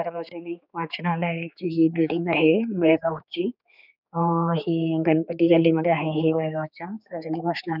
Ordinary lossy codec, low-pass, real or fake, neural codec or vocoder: none; 5.4 kHz; fake; codec, 16 kHz, 4 kbps, X-Codec, HuBERT features, trained on general audio